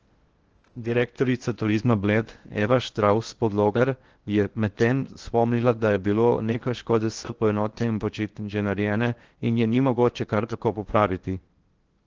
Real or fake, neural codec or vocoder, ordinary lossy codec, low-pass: fake; codec, 16 kHz in and 24 kHz out, 0.6 kbps, FocalCodec, streaming, 2048 codes; Opus, 16 kbps; 7.2 kHz